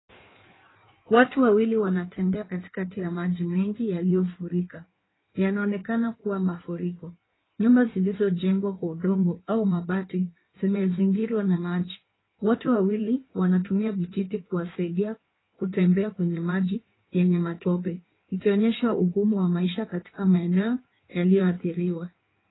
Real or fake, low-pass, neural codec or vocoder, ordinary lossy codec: fake; 7.2 kHz; codec, 16 kHz in and 24 kHz out, 1.1 kbps, FireRedTTS-2 codec; AAC, 16 kbps